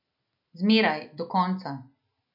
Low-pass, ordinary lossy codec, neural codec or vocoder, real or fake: 5.4 kHz; none; none; real